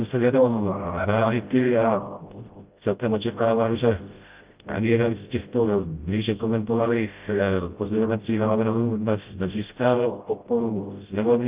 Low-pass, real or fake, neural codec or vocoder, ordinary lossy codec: 3.6 kHz; fake; codec, 16 kHz, 0.5 kbps, FreqCodec, smaller model; Opus, 24 kbps